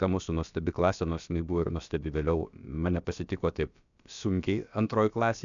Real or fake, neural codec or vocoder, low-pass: fake; codec, 16 kHz, about 1 kbps, DyCAST, with the encoder's durations; 7.2 kHz